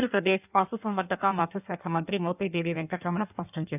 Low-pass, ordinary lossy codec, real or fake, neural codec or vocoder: 3.6 kHz; none; fake; codec, 16 kHz in and 24 kHz out, 1.1 kbps, FireRedTTS-2 codec